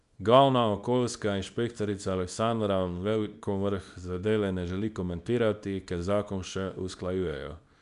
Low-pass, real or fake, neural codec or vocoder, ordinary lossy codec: 10.8 kHz; fake; codec, 24 kHz, 0.9 kbps, WavTokenizer, small release; none